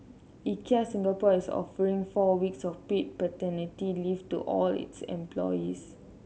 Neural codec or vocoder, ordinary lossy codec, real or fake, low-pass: none; none; real; none